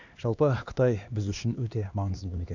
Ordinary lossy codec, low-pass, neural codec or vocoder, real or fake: Opus, 64 kbps; 7.2 kHz; codec, 16 kHz, 2 kbps, X-Codec, HuBERT features, trained on LibriSpeech; fake